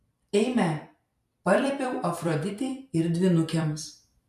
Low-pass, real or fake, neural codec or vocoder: 14.4 kHz; fake; vocoder, 44.1 kHz, 128 mel bands every 256 samples, BigVGAN v2